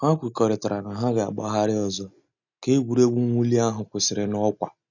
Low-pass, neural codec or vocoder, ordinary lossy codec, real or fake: 7.2 kHz; none; none; real